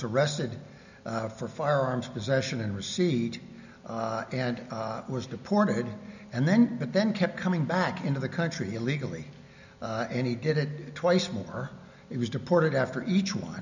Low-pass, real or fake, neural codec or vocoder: 7.2 kHz; real; none